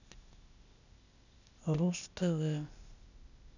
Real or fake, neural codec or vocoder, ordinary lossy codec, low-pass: fake; codec, 16 kHz, 0.8 kbps, ZipCodec; none; 7.2 kHz